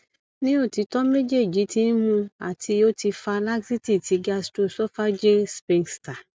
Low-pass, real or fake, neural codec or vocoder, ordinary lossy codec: none; real; none; none